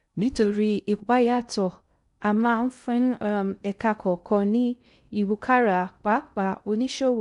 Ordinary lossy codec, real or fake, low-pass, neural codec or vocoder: none; fake; 10.8 kHz; codec, 16 kHz in and 24 kHz out, 0.6 kbps, FocalCodec, streaming, 2048 codes